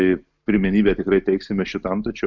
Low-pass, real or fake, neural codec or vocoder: 7.2 kHz; real; none